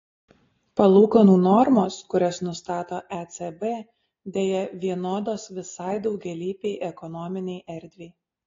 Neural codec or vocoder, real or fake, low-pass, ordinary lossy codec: none; real; 7.2 kHz; AAC, 32 kbps